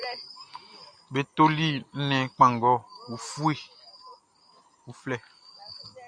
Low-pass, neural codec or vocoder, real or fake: 9.9 kHz; none; real